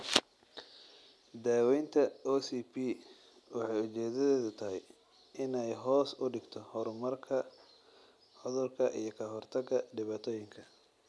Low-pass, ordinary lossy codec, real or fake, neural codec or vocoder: none; none; real; none